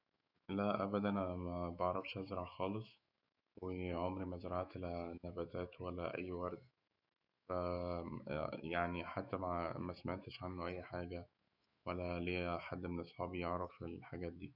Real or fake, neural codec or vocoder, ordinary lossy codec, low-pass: fake; autoencoder, 48 kHz, 128 numbers a frame, DAC-VAE, trained on Japanese speech; none; 5.4 kHz